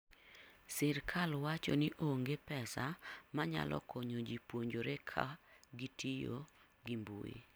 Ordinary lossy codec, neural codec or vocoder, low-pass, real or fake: none; none; none; real